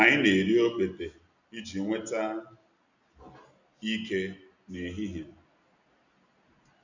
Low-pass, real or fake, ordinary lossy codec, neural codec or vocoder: 7.2 kHz; real; none; none